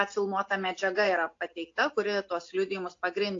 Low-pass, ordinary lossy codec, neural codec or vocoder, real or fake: 7.2 kHz; AAC, 48 kbps; none; real